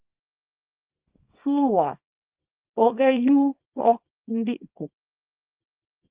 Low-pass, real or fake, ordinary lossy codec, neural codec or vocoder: 3.6 kHz; fake; Opus, 32 kbps; codec, 24 kHz, 0.9 kbps, WavTokenizer, small release